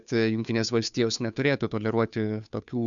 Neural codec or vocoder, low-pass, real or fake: codec, 16 kHz, 2 kbps, FunCodec, trained on Chinese and English, 25 frames a second; 7.2 kHz; fake